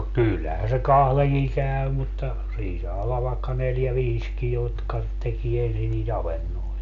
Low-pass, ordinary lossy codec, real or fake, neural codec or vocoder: 7.2 kHz; none; real; none